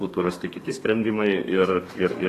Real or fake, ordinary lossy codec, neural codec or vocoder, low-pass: fake; AAC, 48 kbps; codec, 32 kHz, 1.9 kbps, SNAC; 14.4 kHz